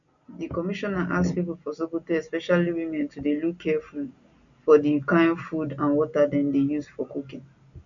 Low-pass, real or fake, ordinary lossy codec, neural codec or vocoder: 7.2 kHz; real; none; none